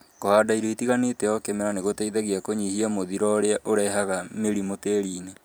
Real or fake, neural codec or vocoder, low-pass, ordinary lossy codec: fake; vocoder, 44.1 kHz, 128 mel bands every 512 samples, BigVGAN v2; none; none